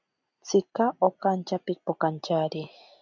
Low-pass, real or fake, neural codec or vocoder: 7.2 kHz; real; none